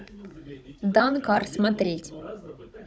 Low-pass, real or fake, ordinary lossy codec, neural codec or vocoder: none; fake; none; codec, 16 kHz, 16 kbps, FunCodec, trained on Chinese and English, 50 frames a second